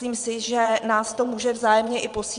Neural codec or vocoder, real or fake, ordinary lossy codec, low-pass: vocoder, 22.05 kHz, 80 mel bands, Vocos; fake; MP3, 64 kbps; 9.9 kHz